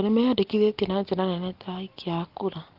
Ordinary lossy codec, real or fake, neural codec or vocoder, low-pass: Opus, 16 kbps; real; none; 5.4 kHz